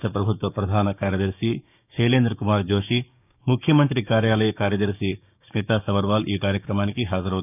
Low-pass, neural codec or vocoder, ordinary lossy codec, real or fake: 3.6 kHz; codec, 16 kHz, 6 kbps, DAC; none; fake